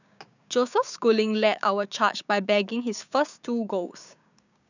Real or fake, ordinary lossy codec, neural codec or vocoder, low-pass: fake; none; codec, 16 kHz, 6 kbps, DAC; 7.2 kHz